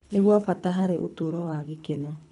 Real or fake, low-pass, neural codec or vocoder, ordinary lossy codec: fake; 10.8 kHz; codec, 24 kHz, 3 kbps, HILCodec; none